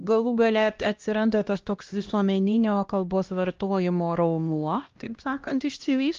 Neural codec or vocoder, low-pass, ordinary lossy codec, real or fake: codec, 16 kHz, 1 kbps, X-Codec, HuBERT features, trained on LibriSpeech; 7.2 kHz; Opus, 32 kbps; fake